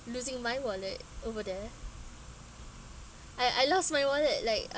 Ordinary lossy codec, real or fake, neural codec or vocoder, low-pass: none; real; none; none